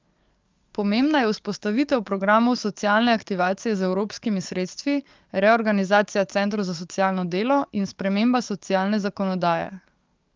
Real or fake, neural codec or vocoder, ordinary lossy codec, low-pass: fake; codec, 44.1 kHz, 7.8 kbps, DAC; Opus, 32 kbps; 7.2 kHz